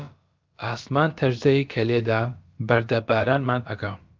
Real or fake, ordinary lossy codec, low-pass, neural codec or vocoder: fake; Opus, 24 kbps; 7.2 kHz; codec, 16 kHz, about 1 kbps, DyCAST, with the encoder's durations